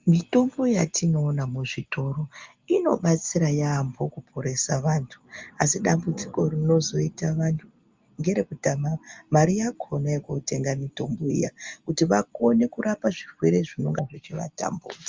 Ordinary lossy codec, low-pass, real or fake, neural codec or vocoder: Opus, 32 kbps; 7.2 kHz; real; none